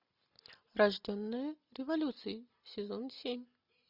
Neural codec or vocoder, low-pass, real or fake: none; 5.4 kHz; real